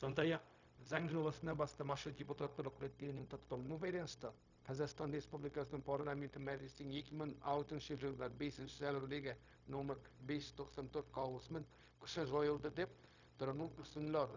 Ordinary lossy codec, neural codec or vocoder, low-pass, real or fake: none; codec, 16 kHz, 0.4 kbps, LongCat-Audio-Codec; 7.2 kHz; fake